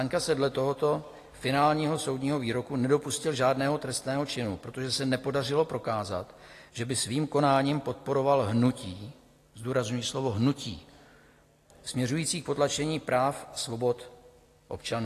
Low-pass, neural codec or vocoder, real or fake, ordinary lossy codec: 14.4 kHz; none; real; AAC, 48 kbps